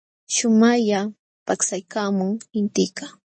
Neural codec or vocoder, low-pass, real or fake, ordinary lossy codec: none; 9.9 kHz; real; MP3, 32 kbps